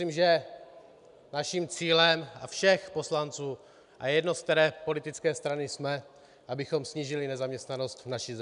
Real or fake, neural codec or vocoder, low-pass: real; none; 10.8 kHz